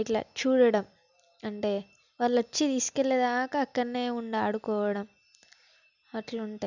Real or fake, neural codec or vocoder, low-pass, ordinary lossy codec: real; none; 7.2 kHz; none